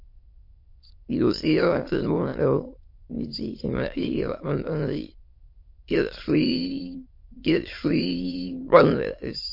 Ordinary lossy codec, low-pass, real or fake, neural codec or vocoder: MP3, 32 kbps; 5.4 kHz; fake; autoencoder, 22.05 kHz, a latent of 192 numbers a frame, VITS, trained on many speakers